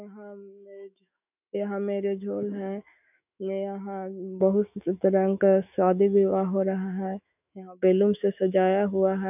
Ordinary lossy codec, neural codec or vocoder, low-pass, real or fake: none; autoencoder, 48 kHz, 128 numbers a frame, DAC-VAE, trained on Japanese speech; 3.6 kHz; fake